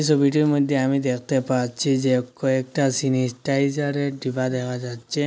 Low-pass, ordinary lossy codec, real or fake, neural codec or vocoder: none; none; real; none